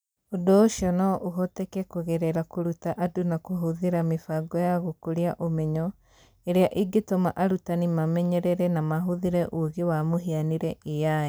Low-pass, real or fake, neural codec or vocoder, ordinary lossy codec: none; real; none; none